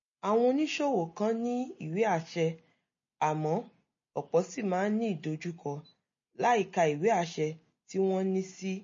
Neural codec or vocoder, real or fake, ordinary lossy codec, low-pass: none; real; MP3, 32 kbps; 7.2 kHz